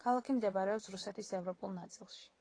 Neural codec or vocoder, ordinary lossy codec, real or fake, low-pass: vocoder, 22.05 kHz, 80 mel bands, WaveNeXt; AAC, 32 kbps; fake; 9.9 kHz